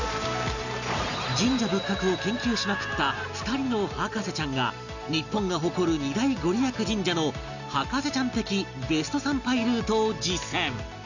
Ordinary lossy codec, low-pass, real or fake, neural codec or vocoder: none; 7.2 kHz; real; none